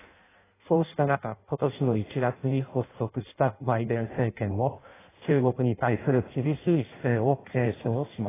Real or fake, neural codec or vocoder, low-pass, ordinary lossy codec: fake; codec, 16 kHz in and 24 kHz out, 0.6 kbps, FireRedTTS-2 codec; 3.6 kHz; AAC, 16 kbps